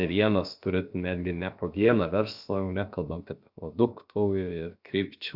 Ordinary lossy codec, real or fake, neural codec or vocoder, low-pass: Opus, 64 kbps; fake; codec, 16 kHz, about 1 kbps, DyCAST, with the encoder's durations; 5.4 kHz